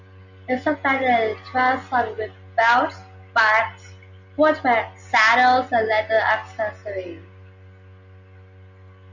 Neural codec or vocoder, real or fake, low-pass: none; real; 7.2 kHz